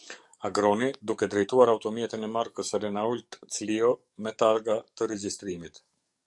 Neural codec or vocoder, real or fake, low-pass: codec, 44.1 kHz, 7.8 kbps, DAC; fake; 10.8 kHz